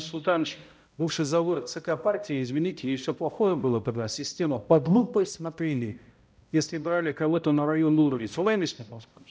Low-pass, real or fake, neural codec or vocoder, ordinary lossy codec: none; fake; codec, 16 kHz, 0.5 kbps, X-Codec, HuBERT features, trained on balanced general audio; none